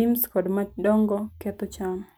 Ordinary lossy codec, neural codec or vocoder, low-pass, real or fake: none; none; none; real